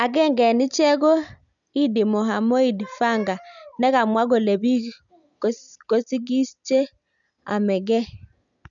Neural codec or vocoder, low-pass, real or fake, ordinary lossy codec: none; 7.2 kHz; real; none